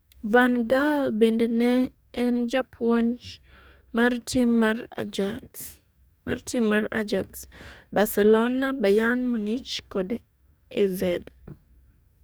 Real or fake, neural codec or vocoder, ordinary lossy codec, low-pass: fake; codec, 44.1 kHz, 2.6 kbps, DAC; none; none